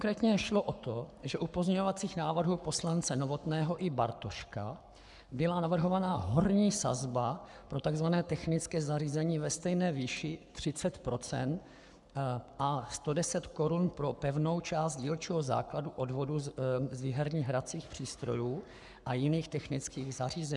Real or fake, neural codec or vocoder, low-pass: fake; codec, 44.1 kHz, 7.8 kbps, Pupu-Codec; 10.8 kHz